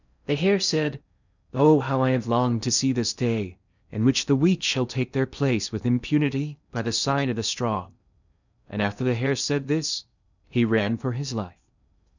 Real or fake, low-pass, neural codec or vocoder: fake; 7.2 kHz; codec, 16 kHz in and 24 kHz out, 0.6 kbps, FocalCodec, streaming, 4096 codes